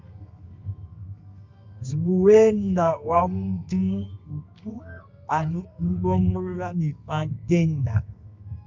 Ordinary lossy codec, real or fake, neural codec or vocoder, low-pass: MP3, 64 kbps; fake; codec, 24 kHz, 0.9 kbps, WavTokenizer, medium music audio release; 7.2 kHz